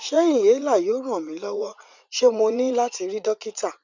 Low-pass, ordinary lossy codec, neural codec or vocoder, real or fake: 7.2 kHz; none; vocoder, 44.1 kHz, 80 mel bands, Vocos; fake